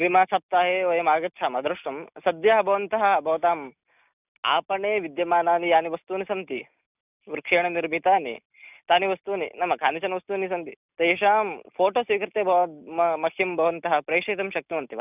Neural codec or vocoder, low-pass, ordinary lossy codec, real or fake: none; 3.6 kHz; none; real